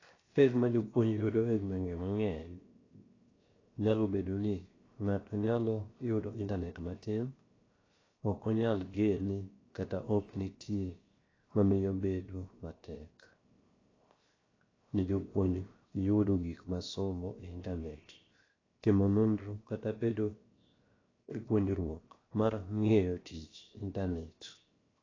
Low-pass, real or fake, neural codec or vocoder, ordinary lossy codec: 7.2 kHz; fake; codec, 16 kHz, 0.7 kbps, FocalCodec; AAC, 32 kbps